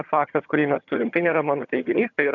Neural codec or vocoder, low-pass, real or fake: vocoder, 22.05 kHz, 80 mel bands, HiFi-GAN; 7.2 kHz; fake